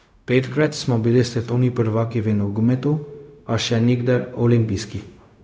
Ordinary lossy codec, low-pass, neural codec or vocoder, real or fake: none; none; codec, 16 kHz, 0.4 kbps, LongCat-Audio-Codec; fake